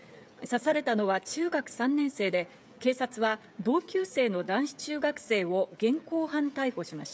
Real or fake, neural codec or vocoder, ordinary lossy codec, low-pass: fake; codec, 16 kHz, 16 kbps, FreqCodec, smaller model; none; none